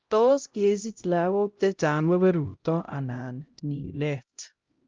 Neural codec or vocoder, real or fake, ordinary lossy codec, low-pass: codec, 16 kHz, 0.5 kbps, X-Codec, HuBERT features, trained on LibriSpeech; fake; Opus, 24 kbps; 7.2 kHz